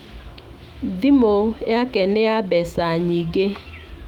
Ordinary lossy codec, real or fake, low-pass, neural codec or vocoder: Opus, 24 kbps; fake; 19.8 kHz; autoencoder, 48 kHz, 128 numbers a frame, DAC-VAE, trained on Japanese speech